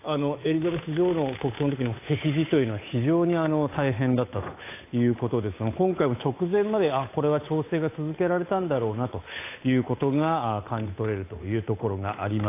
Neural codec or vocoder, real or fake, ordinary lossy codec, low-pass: codec, 16 kHz, 8 kbps, FunCodec, trained on Chinese and English, 25 frames a second; fake; AAC, 24 kbps; 3.6 kHz